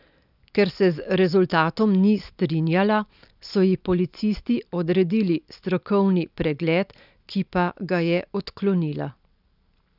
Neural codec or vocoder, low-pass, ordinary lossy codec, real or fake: none; 5.4 kHz; none; real